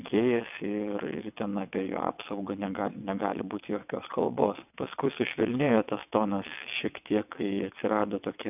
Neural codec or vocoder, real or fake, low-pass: vocoder, 22.05 kHz, 80 mel bands, WaveNeXt; fake; 3.6 kHz